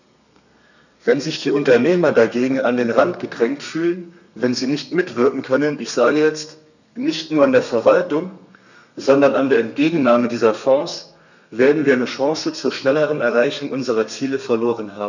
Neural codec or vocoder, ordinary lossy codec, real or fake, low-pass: codec, 32 kHz, 1.9 kbps, SNAC; none; fake; 7.2 kHz